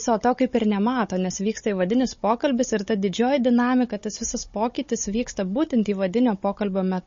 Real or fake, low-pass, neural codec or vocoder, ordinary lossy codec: fake; 7.2 kHz; codec, 16 kHz, 16 kbps, FunCodec, trained on Chinese and English, 50 frames a second; MP3, 32 kbps